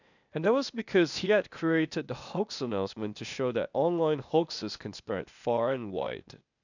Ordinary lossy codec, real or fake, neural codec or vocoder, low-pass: none; fake; codec, 16 kHz, 0.8 kbps, ZipCodec; 7.2 kHz